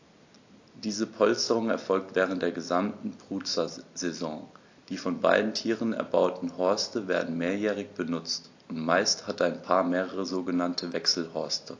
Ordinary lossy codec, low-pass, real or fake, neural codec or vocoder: AAC, 48 kbps; 7.2 kHz; real; none